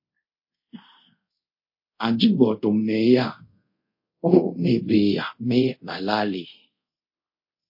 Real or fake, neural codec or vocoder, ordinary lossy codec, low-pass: fake; codec, 24 kHz, 0.5 kbps, DualCodec; MP3, 32 kbps; 5.4 kHz